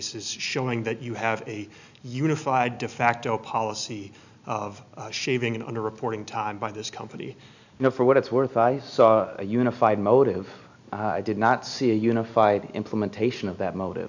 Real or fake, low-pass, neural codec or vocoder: real; 7.2 kHz; none